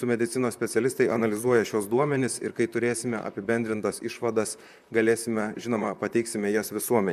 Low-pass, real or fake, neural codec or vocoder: 14.4 kHz; fake; vocoder, 44.1 kHz, 128 mel bands, Pupu-Vocoder